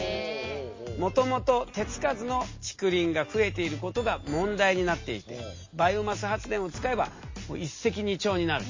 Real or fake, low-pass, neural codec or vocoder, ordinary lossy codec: real; 7.2 kHz; none; MP3, 32 kbps